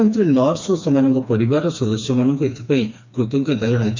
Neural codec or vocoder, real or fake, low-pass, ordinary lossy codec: codec, 16 kHz, 2 kbps, FreqCodec, smaller model; fake; 7.2 kHz; MP3, 64 kbps